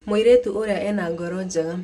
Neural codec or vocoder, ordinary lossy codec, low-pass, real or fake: none; none; 14.4 kHz; real